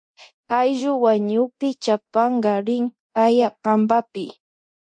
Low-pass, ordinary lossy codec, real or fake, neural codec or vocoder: 9.9 kHz; MP3, 48 kbps; fake; codec, 24 kHz, 0.9 kbps, DualCodec